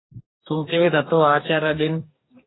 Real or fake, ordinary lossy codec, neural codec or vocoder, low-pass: fake; AAC, 16 kbps; codec, 44.1 kHz, 2.6 kbps, DAC; 7.2 kHz